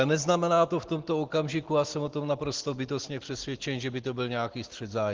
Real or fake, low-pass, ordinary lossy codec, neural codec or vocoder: real; 7.2 kHz; Opus, 16 kbps; none